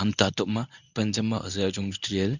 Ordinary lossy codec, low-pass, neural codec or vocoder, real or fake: none; 7.2 kHz; codec, 24 kHz, 0.9 kbps, WavTokenizer, medium speech release version 1; fake